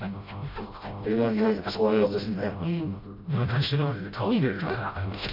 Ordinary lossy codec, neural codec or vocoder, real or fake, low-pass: MP3, 32 kbps; codec, 16 kHz, 0.5 kbps, FreqCodec, smaller model; fake; 5.4 kHz